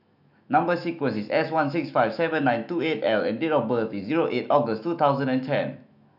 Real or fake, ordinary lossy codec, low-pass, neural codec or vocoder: fake; none; 5.4 kHz; autoencoder, 48 kHz, 128 numbers a frame, DAC-VAE, trained on Japanese speech